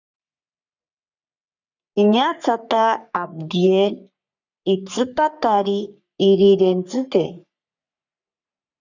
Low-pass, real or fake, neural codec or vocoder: 7.2 kHz; fake; codec, 44.1 kHz, 3.4 kbps, Pupu-Codec